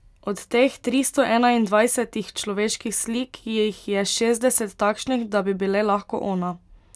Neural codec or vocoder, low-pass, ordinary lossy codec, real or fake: none; none; none; real